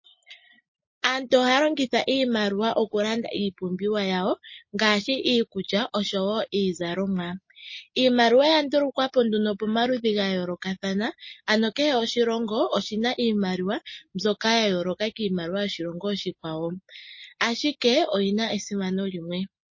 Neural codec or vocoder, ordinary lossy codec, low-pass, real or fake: none; MP3, 32 kbps; 7.2 kHz; real